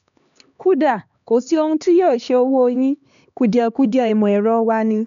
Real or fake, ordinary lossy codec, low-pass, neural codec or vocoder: fake; none; 7.2 kHz; codec, 16 kHz, 2 kbps, X-Codec, HuBERT features, trained on LibriSpeech